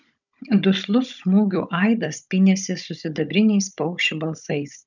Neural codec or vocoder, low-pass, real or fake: codec, 16 kHz, 16 kbps, FunCodec, trained on Chinese and English, 50 frames a second; 7.2 kHz; fake